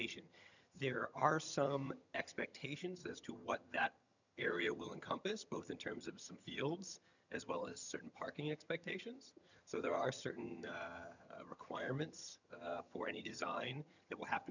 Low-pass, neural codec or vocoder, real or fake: 7.2 kHz; vocoder, 22.05 kHz, 80 mel bands, HiFi-GAN; fake